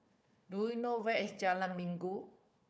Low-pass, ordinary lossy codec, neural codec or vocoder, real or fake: none; none; codec, 16 kHz, 4 kbps, FunCodec, trained on Chinese and English, 50 frames a second; fake